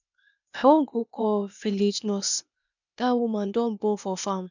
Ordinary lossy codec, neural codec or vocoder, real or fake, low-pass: none; codec, 16 kHz, 0.8 kbps, ZipCodec; fake; 7.2 kHz